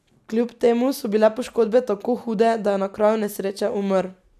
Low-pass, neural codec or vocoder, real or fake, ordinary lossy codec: 14.4 kHz; none; real; none